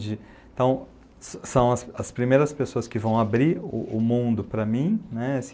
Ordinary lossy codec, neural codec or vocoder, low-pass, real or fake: none; none; none; real